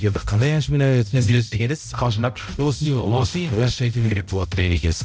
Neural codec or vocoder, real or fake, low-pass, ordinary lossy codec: codec, 16 kHz, 0.5 kbps, X-Codec, HuBERT features, trained on balanced general audio; fake; none; none